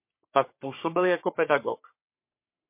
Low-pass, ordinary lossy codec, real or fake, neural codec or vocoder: 3.6 kHz; MP3, 24 kbps; fake; codec, 44.1 kHz, 3.4 kbps, Pupu-Codec